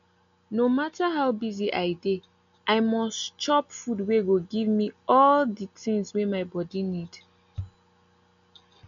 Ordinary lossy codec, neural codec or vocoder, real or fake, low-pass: MP3, 64 kbps; none; real; 7.2 kHz